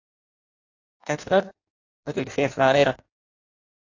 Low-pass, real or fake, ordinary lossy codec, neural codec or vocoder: 7.2 kHz; fake; AAC, 48 kbps; codec, 16 kHz in and 24 kHz out, 0.6 kbps, FireRedTTS-2 codec